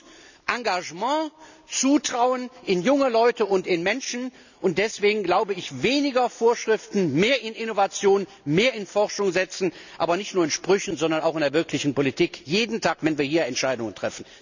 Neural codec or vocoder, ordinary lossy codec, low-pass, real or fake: none; none; 7.2 kHz; real